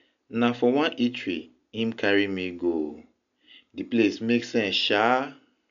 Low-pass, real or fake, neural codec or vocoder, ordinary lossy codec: 7.2 kHz; real; none; none